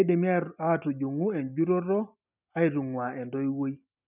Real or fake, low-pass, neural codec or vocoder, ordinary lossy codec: real; 3.6 kHz; none; none